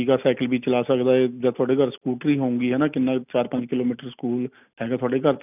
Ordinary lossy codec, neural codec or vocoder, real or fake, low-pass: none; none; real; 3.6 kHz